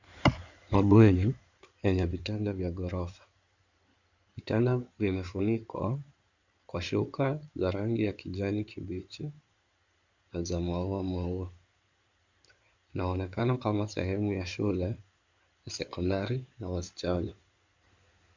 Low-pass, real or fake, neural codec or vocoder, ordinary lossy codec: 7.2 kHz; fake; codec, 16 kHz in and 24 kHz out, 2.2 kbps, FireRedTTS-2 codec; Opus, 64 kbps